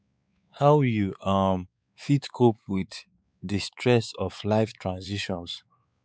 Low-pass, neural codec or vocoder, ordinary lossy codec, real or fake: none; codec, 16 kHz, 4 kbps, X-Codec, WavLM features, trained on Multilingual LibriSpeech; none; fake